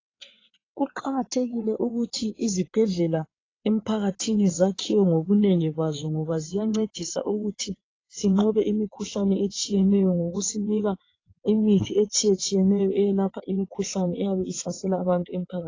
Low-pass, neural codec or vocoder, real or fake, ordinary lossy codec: 7.2 kHz; vocoder, 22.05 kHz, 80 mel bands, WaveNeXt; fake; AAC, 32 kbps